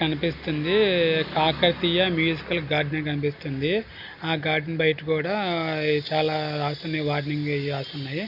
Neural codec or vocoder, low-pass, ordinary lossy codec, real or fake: none; 5.4 kHz; none; real